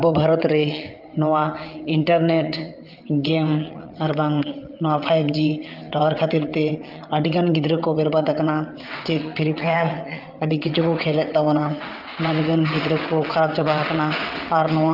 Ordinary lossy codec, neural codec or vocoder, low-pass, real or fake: Opus, 24 kbps; vocoder, 22.05 kHz, 80 mel bands, WaveNeXt; 5.4 kHz; fake